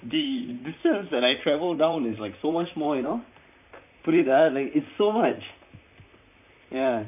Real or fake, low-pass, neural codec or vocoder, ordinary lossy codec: fake; 3.6 kHz; vocoder, 44.1 kHz, 128 mel bands, Pupu-Vocoder; none